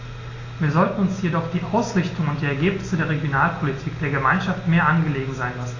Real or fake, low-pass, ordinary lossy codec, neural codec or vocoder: real; 7.2 kHz; AAC, 32 kbps; none